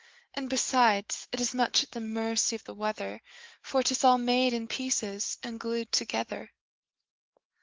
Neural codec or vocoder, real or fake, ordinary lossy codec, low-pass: autoencoder, 48 kHz, 128 numbers a frame, DAC-VAE, trained on Japanese speech; fake; Opus, 16 kbps; 7.2 kHz